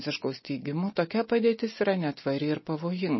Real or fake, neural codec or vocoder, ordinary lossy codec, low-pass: real; none; MP3, 24 kbps; 7.2 kHz